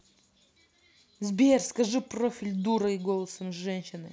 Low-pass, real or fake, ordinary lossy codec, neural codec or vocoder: none; real; none; none